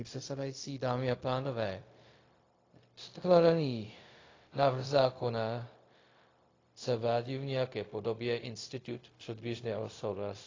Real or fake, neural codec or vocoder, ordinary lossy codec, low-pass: fake; codec, 16 kHz, 0.4 kbps, LongCat-Audio-Codec; AAC, 32 kbps; 7.2 kHz